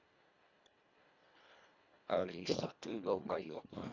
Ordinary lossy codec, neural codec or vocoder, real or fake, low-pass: none; codec, 24 kHz, 1.5 kbps, HILCodec; fake; 7.2 kHz